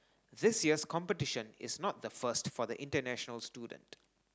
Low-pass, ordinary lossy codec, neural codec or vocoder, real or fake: none; none; none; real